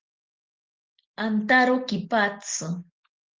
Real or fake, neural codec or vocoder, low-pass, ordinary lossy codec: real; none; 7.2 kHz; Opus, 16 kbps